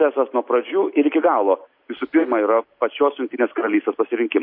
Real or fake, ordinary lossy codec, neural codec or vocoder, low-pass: real; MP3, 32 kbps; none; 5.4 kHz